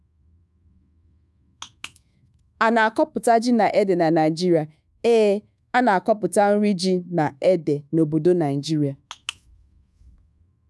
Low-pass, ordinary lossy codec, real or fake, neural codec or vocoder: none; none; fake; codec, 24 kHz, 1.2 kbps, DualCodec